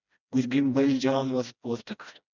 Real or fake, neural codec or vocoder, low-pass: fake; codec, 16 kHz, 1 kbps, FreqCodec, smaller model; 7.2 kHz